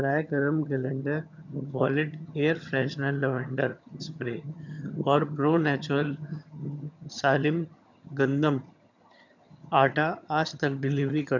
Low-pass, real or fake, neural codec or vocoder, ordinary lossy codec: 7.2 kHz; fake; vocoder, 22.05 kHz, 80 mel bands, HiFi-GAN; none